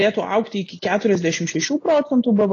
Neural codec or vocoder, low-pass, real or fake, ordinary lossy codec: none; 7.2 kHz; real; AAC, 32 kbps